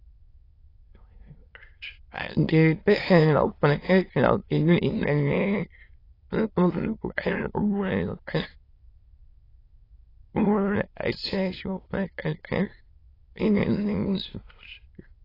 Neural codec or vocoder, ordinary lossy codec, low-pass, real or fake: autoencoder, 22.05 kHz, a latent of 192 numbers a frame, VITS, trained on many speakers; AAC, 24 kbps; 5.4 kHz; fake